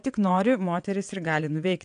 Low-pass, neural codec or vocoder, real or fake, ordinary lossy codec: 9.9 kHz; vocoder, 22.05 kHz, 80 mel bands, WaveNeXt; fake; AAC, 64 kbps